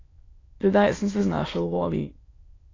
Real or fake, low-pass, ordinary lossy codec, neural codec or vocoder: fake; 7.2 kHz; AAC, 32 kbps; autoencoder, 22.05 kHz, a latent of 192 numbers a frame, VITS, trained on many speakers